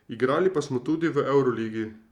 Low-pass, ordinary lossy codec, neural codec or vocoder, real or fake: 19.8 kHz; Opus, 64 kbps; vocoder, 44.1 kHz, 128 mel bands every 512 samples, BigVGAN v2; fake